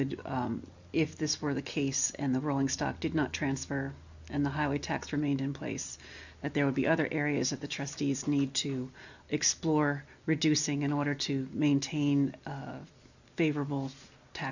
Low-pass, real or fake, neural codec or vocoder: 7.2 kHz; real; none